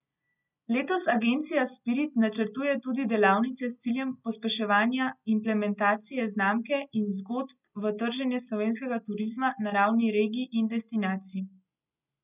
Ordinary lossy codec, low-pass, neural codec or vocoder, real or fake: none; 3.6 kHz; none; real